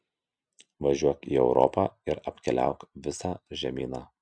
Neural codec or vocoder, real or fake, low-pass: none; real; 9.9 kHz